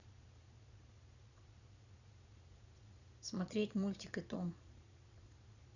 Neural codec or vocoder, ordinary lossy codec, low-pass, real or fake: vocoder, 22.05 kHz, 80 mel bands, Vocos; none; 7.2 kHz; fake